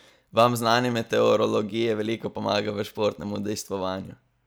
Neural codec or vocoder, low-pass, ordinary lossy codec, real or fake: none; none; none; real